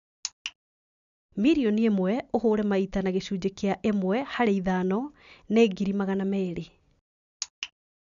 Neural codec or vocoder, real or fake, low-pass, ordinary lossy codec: none; real; 7.2 kHz; none